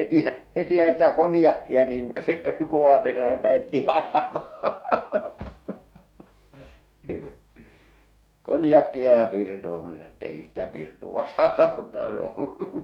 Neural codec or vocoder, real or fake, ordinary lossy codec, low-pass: codec, 44.1 kHz, 2.6 kbps, DAC; fake; none; 19.8 kHz